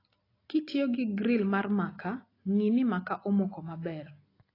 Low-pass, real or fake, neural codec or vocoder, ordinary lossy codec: 5.4 kHz; real; none; AAC, 24 kbps